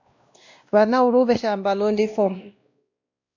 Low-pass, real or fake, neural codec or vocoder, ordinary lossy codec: 7.2 kHz; fake; codec, 16 kHz, 1 kbps, X-Codec, WavLM features, trained on Multilingual LibriSpeech; AAC, 48 kbps